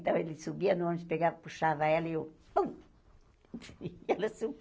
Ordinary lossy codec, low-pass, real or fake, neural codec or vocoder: none; none; real; none